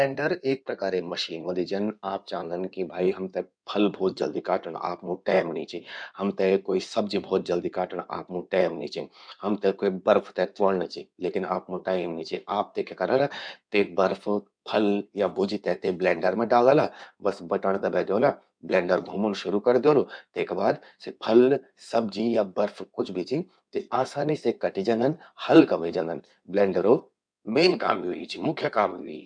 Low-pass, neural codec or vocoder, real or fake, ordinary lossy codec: 9.9 kHz; codec, 16 kHz in and 24 kHz out, 2.2 kbps, FireRedTTS-2 codec; fake; none